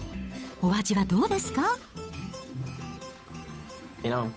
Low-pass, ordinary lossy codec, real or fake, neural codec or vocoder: none; none; fake; codec, 16 kHz, 8 kbps, FunCodec, trained on Chinese and English, 25 frames a second